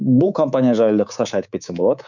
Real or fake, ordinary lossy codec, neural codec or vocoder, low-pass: fake; none; codec, 24 kHz, 3.1 kbps, DualCodec; 7.2 kHz